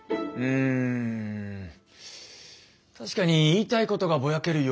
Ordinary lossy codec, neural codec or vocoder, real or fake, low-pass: none; none; real; none